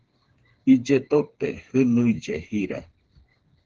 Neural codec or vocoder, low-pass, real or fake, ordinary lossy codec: codec, 16 kHz, 4 kbps, FreqCodec, smaller model; 7.2 kHz; fake; Opus, 16 kbps